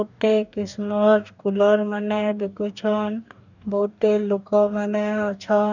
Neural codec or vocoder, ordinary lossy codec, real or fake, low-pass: codec, 44.1 kHz, 2.6 kbps, DAC; none; fake; 7.2 kHz